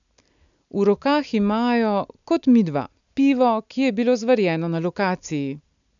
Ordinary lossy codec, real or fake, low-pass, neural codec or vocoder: none; real; 7.2 kHz; none